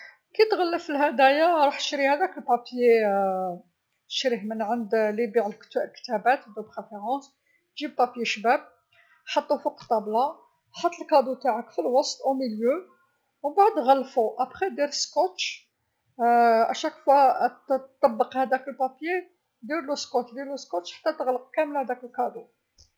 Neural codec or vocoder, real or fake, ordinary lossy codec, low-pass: none; real; none; none